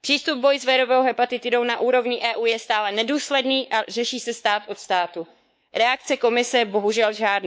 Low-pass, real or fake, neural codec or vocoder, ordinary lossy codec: none; fake; codec, 16 kHz, 4 kbps, X-Codec, WavLM features, trained on Multilingual LibriSpeech; none